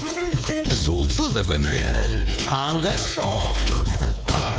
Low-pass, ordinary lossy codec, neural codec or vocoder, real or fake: none; none; codec, 16 kHz, 2 kbps, X-Codec, WavLM features, trained on Multilingual LibriSpeech; fake